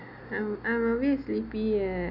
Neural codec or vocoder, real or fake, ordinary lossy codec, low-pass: none; real; none; 5.4 kHz